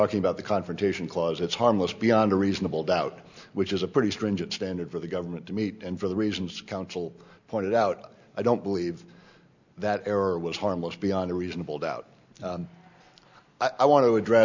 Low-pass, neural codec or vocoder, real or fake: 7.2 kHz; none; real